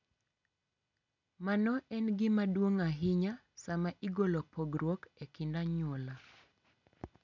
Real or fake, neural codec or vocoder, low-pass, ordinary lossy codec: real; none; 7.2 kHz; none